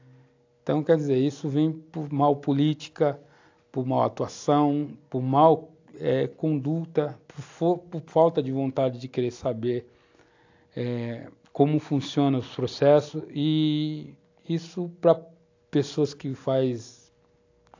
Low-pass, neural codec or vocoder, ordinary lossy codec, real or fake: 7.2 kHz; none; AAC, 48 kbps; real